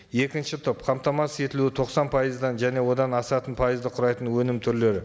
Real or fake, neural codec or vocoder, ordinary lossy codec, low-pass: real; none; none; none